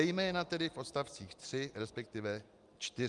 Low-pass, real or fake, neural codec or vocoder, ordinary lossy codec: 10.8 kHz; real; none; Opus, 32 kbps